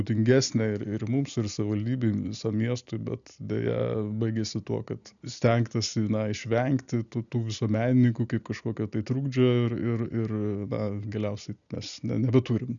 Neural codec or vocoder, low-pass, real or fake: none; 7.2 kHz; real